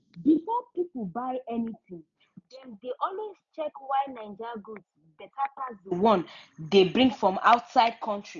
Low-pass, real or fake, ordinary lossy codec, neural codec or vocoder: 7.2 kHz; real; none; none